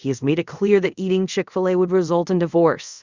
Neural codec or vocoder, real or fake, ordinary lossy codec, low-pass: codec, 24 kHz, 0.5 kbps, DualCodec; fake; Opus, 64 kbps; 7.2 kHz